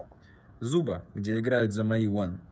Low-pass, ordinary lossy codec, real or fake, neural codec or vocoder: none; none; fake; codec, 16 kHz, 8 kbps, FreqCodec, smaller model